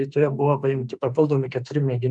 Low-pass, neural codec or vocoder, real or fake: 10.8 kHz; autoencoder, 48 kHz, 32 numbers a frame, DAC-VAE, trained on Japanese speech; fake